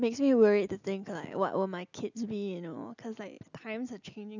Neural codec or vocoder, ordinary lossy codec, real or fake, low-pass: none; none; real; 7.2 kHz